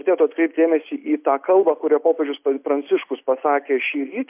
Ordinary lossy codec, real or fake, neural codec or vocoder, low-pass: MP3, 32 kbps; real; none; 3.6 kHz